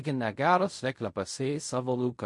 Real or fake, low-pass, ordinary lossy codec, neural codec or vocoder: fake; 10.8 kHz; MP3, 48 kbps; codec, 16 kHz in and 24 kHz out, 0.4 kbps, LongCat-Audio-Codec, fine tuned four codebook decoder